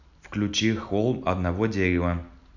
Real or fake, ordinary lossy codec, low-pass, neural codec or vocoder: real; none; 7.2 kHz; none